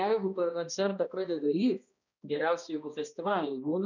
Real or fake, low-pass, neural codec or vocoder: fake; 7.2 kHz; codec, 16 kHz, 1 kbps, X-Codec, HuBERT features, trained on balanced general audio